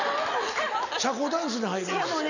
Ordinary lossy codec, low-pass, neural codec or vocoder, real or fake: none; 7.2 kHz; none; real